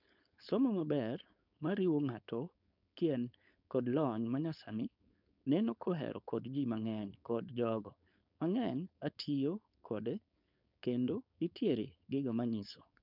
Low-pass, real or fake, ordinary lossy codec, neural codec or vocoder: 5.4 kHz; fake; none; codec, 16 kHz, 4.8 kbps, FACodec